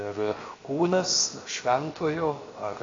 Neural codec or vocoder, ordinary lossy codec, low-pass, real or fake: codec, 16 kHz, 0.7 kbps, FocalCodec; AAC, 32 kbps; 7.2 kHz; fake